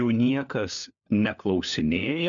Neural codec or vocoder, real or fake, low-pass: codec, 16 kHz, 4 kbps, FunCodec, trained on LibriTTS, 50 frames a second; fake; 7.2 kHz